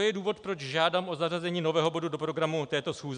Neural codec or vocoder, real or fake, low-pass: none; real; 9.9 kHz